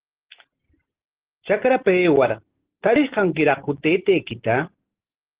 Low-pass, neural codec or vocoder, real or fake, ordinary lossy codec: 3.6 kHz; none; real; Opus, 16 kbps